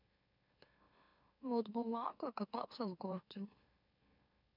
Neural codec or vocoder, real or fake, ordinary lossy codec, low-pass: autoencoder, 44.1 kHz, a latent of 192 numbers a frame, MeloTTS; fake; none; 5.4 kHz